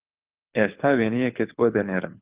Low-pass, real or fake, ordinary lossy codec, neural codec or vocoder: 3.6 kHz; fake; Opus, 24 kbps; codec, 24 kHz, 6 kbps, HILCodec